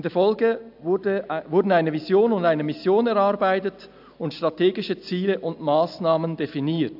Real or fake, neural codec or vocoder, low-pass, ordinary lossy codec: real; none; 5.4 kHz; AAC, 48 kbps